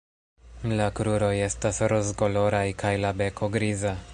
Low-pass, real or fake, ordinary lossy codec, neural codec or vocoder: 10.8 kHz; real; MP3, 96 kbps; none